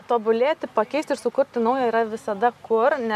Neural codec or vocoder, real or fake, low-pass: none; real; 14.4 kHz